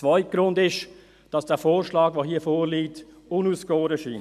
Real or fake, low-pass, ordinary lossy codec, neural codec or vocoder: real; 14.4 kHz; none; none